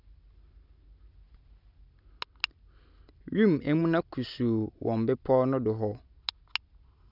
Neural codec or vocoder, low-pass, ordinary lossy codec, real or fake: none; 5.4 kHz; none; real